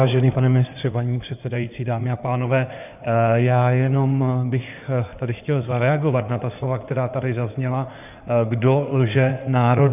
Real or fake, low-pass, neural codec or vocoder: fake; 3.6 kHz; codec, 16 kHz in and 24 kHz out, 2.2 kbps, FireRedTTS-2 codec